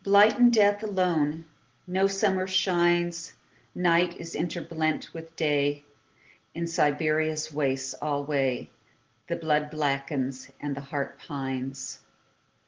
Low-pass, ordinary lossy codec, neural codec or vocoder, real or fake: 7.2 kHz; Opus, 16 kbps; none; real